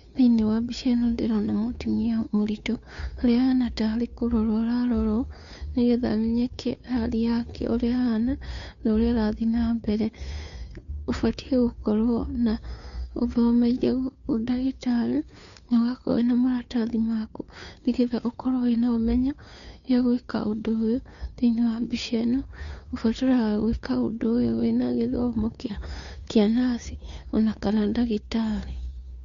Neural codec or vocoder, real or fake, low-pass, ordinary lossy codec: codec, 16 kHz, 2 kbps, FunCodec, trained on Chinese and English, 25 frames a second; fake; 7.2 kHz; none